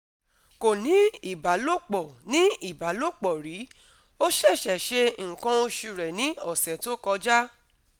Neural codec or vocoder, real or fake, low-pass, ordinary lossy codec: none; real; none; none